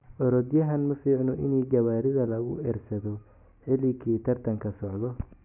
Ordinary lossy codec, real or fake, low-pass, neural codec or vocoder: none; real; 3.6 kHz; none